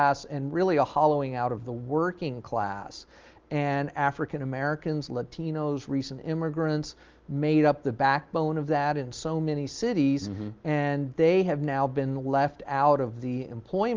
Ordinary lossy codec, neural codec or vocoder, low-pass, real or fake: Opus, 32 kbps; none; 7.2 kHz; real